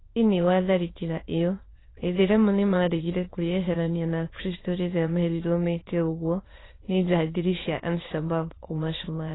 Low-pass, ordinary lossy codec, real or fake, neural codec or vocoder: 7.2 kHz; AAC, 16 kbps; fake; autoencoder, 22.05 kHz, a latent of 192 numbers a frame, VITS, trained on many speakers